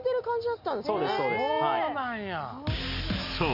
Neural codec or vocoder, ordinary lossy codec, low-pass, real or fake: none; none; 5.4 kHz; real